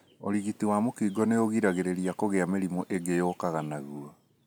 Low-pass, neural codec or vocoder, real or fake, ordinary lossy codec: none; none; real; none